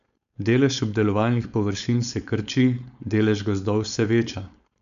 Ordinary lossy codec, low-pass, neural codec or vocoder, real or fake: none; 7.2 kHz; codec, 16 kHz, 4.8 kbps, FACodec; fake